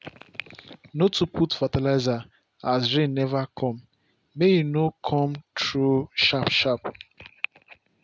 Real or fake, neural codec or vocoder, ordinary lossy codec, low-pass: real; none; none; none